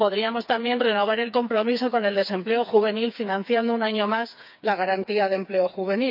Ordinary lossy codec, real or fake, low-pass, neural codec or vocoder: none; fake; 5.4 kHz; codec, 16 kHz, 4 kbps, FreqCodec, smaller model